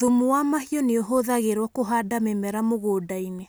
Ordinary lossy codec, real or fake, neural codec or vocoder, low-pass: none; real; none; none